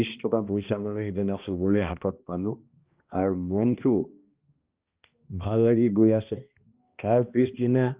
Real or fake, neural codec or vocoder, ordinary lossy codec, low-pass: fake; codec, 16 kHz, 1 kbps, X-Codec, HuBERT features, trained on balanced general audio; Opus, 24 kbps; 3.6 kHz